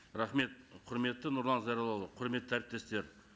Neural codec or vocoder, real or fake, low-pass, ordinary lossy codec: none; real; none; none